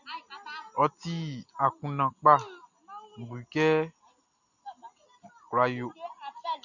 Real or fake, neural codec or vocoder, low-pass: real; none; 7.2 kHz